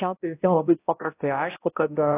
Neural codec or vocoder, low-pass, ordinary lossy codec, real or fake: codec, 16 kHz, 0.5 kbps, X-Codec, HuBERT features, trained on balanced general audio; 3.6 kHz; AAC, 16 kbps; fake